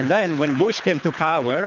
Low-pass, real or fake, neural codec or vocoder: 7.2 kHz; fake; codec, 24 kHz, 3 kbps, HILCodec